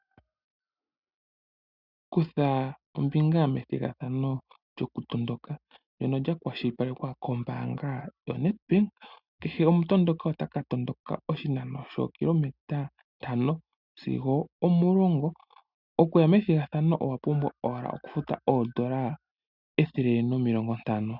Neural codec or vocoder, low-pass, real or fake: none; 5.4 kHz; real